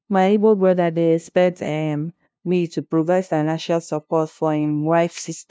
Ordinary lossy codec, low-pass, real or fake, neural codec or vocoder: none; none; fake; codec, 16 kHz, 0.5 kbps, FunCodec, trained on LibriTTS, 25 frames a second